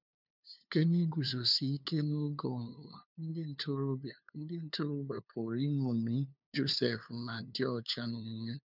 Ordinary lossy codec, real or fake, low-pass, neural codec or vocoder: none; fake; 5.4 kHz; codec, 16 kHz, 2 kbps, FunCodec, trained on LibriTTS, 25 frames a second